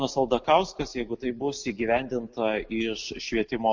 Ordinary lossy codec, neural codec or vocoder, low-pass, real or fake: MP3, 48 kbps; none; 7.2 kHz; real